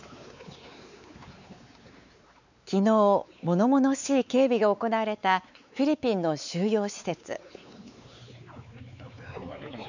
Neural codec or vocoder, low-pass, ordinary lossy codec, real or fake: codec, 16 kHz, 4 kbps, X-Codec, WavLM features, trained on Multilingual LibriSpeech; 7.2 kHz; none; fake